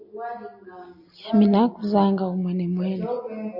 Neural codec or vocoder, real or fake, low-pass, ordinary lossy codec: none; real; 5.4 kHz; AAC, 48 kbps